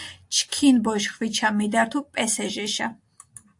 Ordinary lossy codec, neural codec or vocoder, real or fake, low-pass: AAC, 64 kbps; none; real; 10.8 kHz